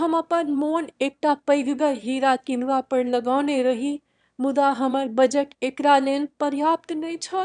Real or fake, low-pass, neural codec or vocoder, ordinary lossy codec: fake; 9.9 kHz; autoencoder, 22.05 kHz, a latent of 192 numbers a frame, VITS, trained on one speaker; none